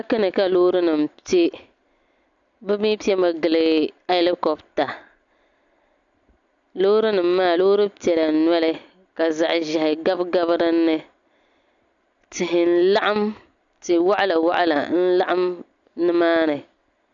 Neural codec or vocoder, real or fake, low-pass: none; real; 7.2 kHz